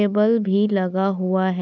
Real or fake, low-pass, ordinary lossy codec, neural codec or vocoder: real; 7.2 kHz; none; none